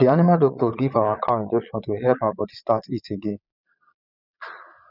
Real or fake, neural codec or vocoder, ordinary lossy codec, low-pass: fake; vocoder, 22.05 kHz, 80 mel bands, Vocos; none; 5.4 kHz